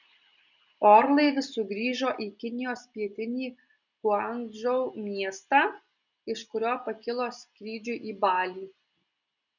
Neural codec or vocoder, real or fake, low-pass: none; real; 7.2 kHz